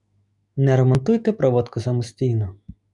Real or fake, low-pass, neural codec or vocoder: fake; 10.8 kHz; autoencoder, 48 kHz, 128 numbers a frame, DAC-VAE, trained on Japanese speech